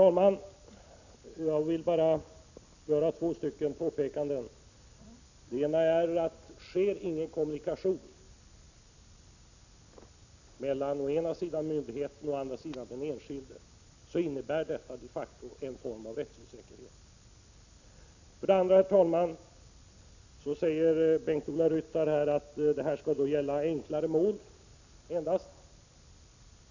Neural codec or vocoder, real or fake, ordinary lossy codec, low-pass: none; real; none; 7.2 kHz